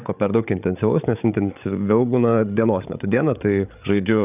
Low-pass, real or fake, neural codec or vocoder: 3.6 kHz; fake; codec, 16 kHz, 8 kbps, FreqCodec, larger model